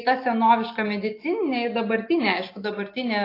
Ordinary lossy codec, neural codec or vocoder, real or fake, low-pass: AAC, 32 kbps; none; real; 5.4 kHz